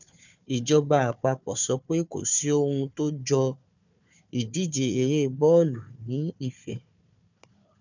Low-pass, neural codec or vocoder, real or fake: 7.2 kHz; codec, 16 kHz, 4 kbps, FunCodec, trained on Chinese and English, 50 frames a second; fake